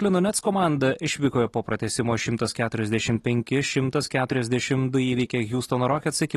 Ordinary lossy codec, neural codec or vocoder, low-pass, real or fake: AAC, 32 kbps; none; 19.8 kHz; real